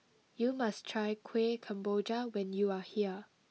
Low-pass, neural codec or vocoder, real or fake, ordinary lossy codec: none; none; real; none